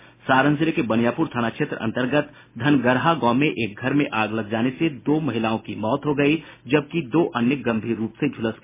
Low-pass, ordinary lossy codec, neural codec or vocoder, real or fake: 3.6 kHz; MP3, 16 kbps; none; real